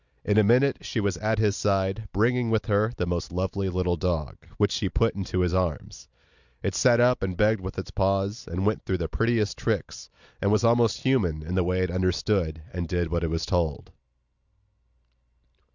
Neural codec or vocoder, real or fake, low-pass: none; real; 7.2 kHz